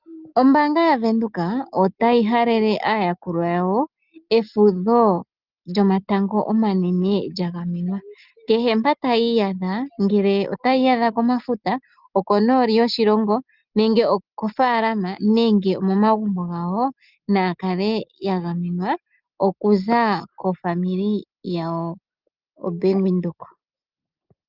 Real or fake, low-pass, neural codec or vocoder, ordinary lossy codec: real; 5.4 kHz; none; Opus, 24 kbps